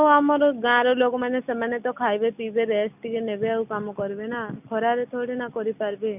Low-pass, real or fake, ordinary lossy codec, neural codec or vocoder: 3.6 kHz; real; none; none